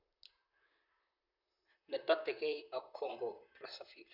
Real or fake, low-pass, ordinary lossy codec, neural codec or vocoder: fake; 5.4 kHz; none; vocoder, 44.1 kHz, 128 mel bands, Pupu-Vocoder